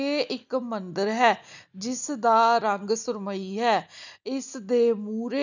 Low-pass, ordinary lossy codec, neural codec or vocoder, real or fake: 7.2 kHz; none; none; real